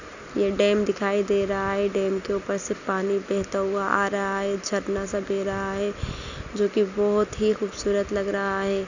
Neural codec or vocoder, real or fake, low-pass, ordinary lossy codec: none; real; 7.2 kHz; none